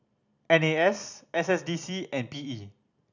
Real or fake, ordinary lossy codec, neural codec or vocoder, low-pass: real; none; none; 7.2 kHz